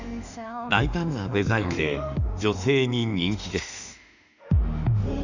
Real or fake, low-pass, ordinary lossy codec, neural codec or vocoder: fake; 7.2 kHz; none; autoencoder, 48 kHz, 32 numbers a frame, DAC-VAE, trained on Japanese speech